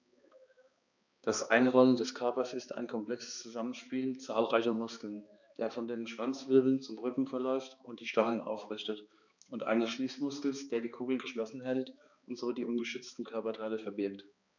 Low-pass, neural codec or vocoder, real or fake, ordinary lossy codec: 7.2 kHz; codec, 16 kHz, 2 kbps, X-Codec, HuBERT features, trained on balanced general audio; fake; none